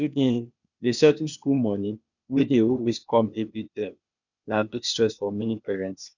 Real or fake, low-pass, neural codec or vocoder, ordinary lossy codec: fake; 7.2 kHz; codec, 16 kHz, 0.8 kbps, ZipCodec; none